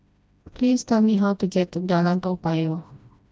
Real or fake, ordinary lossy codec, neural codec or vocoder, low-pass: fake; none; codec, 16 kHz, 1 kbps, FreqCodec, smaller model; none